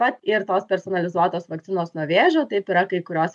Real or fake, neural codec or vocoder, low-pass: real; none; 10.8 kHz